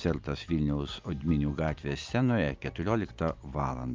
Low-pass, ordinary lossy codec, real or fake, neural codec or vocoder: 7.2 kHz; Opus, 24 kbps; real; none